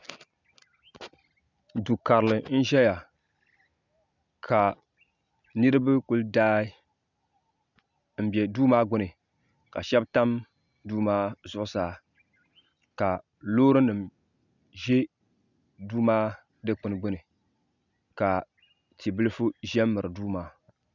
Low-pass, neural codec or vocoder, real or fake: 7.2 kHz; none; real